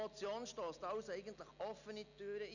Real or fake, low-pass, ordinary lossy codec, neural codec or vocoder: real; 7.2 kHz; none; none